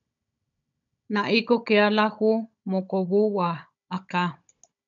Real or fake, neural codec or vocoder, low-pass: fake; codec, 16 kHz, 16 kbps, FunCodec, trained on Chinese and English, 50 frames a second; 7.2 kHz